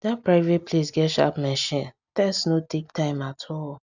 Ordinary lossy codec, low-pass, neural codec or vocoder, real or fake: none; 7.2 kHz; none; real